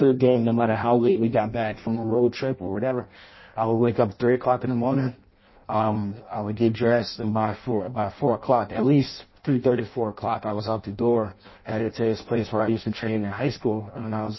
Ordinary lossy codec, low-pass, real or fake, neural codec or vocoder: MP3, 24 kbps; 7.2 kHz; fake; codec, 16 kHz in and 24 kHz out, 0.6 kbps, FireRedTTS-2 codec